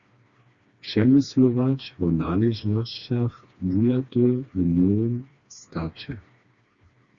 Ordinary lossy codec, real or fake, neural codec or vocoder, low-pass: Opus, 64 kbps; fake; codec, 16 kHz, 2 kbps, FreqCodec, smaller model; 7.2 kHz